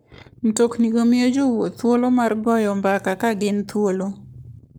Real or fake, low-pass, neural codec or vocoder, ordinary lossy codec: fake; none; codec, 44.1 kHz, 7.8 kbps, Pupu-Codec; none